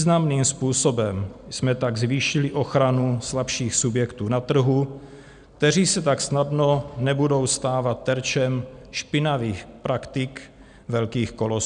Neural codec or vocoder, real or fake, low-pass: none; real; 9.9 kHz